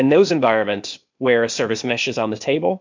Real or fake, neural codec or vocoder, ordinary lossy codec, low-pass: fake; codec, 16 kHz, 0.8 kbps, ZipCodec; MP3, 64 kbps; 7.2 kHz